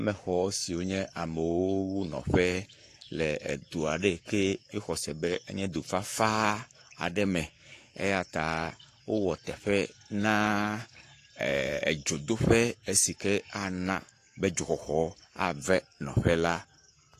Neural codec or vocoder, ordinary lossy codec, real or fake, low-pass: codec, 44.1 kHz, 7.8 kbps, Pupu-Codec; AAC, 48 kbps; fake; 14.4 kHz